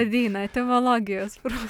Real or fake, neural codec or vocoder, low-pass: real; none; 19.8 kHz